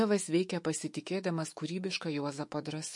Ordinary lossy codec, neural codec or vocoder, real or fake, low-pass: MP3, 48 kbps; autoencoder, 48 kHz, 128 numbers a frame, DAC-VAE, trained on Japanese speech; fake; 10.8 kHz